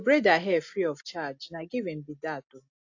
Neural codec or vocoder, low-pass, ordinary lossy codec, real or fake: none; 7.2 kHz; AAC, 48 kbps; real